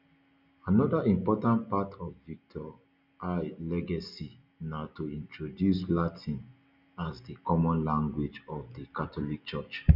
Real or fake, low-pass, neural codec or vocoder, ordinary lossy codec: real; 5.4 kHz; none; none